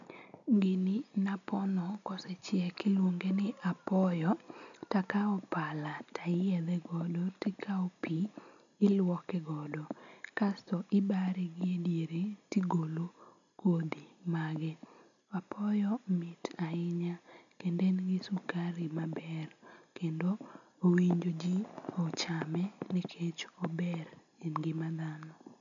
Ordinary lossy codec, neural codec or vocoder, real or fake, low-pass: none; none; real; 7.2 kHz